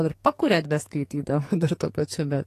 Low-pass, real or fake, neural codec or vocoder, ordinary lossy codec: 14.4 kHz; fake; codec, 44.1 kHz, 2.6 kbps, SNAC; AAC, 48 kbps